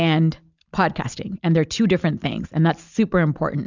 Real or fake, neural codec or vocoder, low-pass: real; none; 7.2 kHz